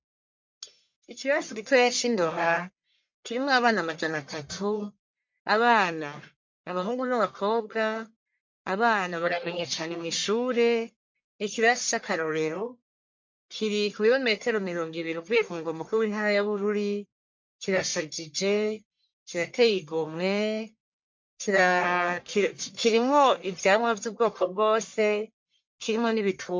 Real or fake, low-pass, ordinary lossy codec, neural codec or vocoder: fake; 7.2 kHz; MP3, 48 kbps; codec, 44.1 kHz, 1.7 kbps, Pupu-Codec